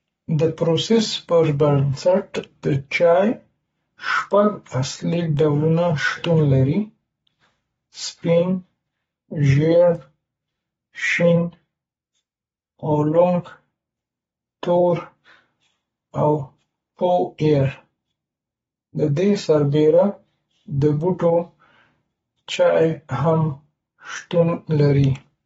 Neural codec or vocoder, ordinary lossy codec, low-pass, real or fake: codec, 44.1 kHz, 7.8 kbps, Pupu-Codec; AAC, 24 kbps; 19.8 kHz; fake